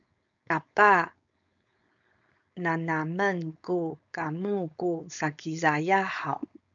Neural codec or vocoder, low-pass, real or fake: codec, 16 kHz, 4.8 kbps, FACodec; 7.2 kHz; fake